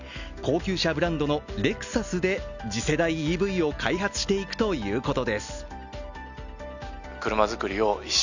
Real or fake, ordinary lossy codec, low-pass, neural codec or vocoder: real; none; 7.2 kHz; none